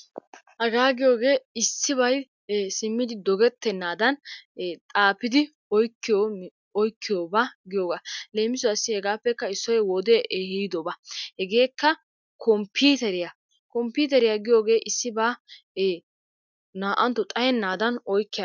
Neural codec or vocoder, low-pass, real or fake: none; 7.2 kHz; real